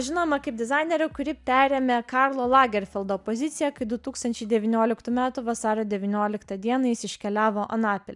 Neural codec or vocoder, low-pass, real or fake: none; 10.8 kHz; real